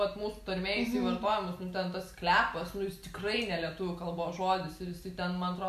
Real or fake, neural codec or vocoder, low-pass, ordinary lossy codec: real; none; 14.4 kHz; AAC, 96 kbps